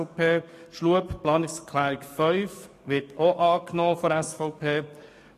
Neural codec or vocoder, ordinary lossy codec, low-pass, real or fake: codec, 44.1 kHz, 7.8 kbps, DAC; AAC, 48 kbps; 14.4 kHz; fake